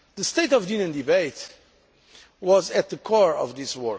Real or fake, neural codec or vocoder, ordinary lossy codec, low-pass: real; none; none; none